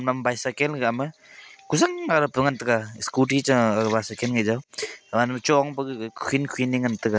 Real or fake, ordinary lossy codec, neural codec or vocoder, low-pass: real; none; none; none